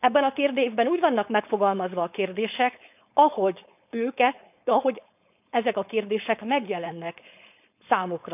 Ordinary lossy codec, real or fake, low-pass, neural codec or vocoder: none; fake; 3.6 kHz; codec, 16 kHz, 4.8 kbps, FACodec